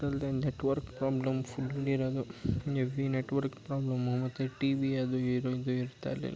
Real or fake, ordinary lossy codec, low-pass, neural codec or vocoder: real; none; none; none